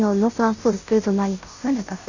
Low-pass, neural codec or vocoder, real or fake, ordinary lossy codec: 7.2 kHz; codec, 16 kHz, 0.5 kbps, FunCodec, trained on Chinese and English, 25 frames a second; fake; none